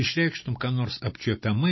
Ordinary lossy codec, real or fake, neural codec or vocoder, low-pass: MP3, 24 kbps; real; none; 7.2 kHz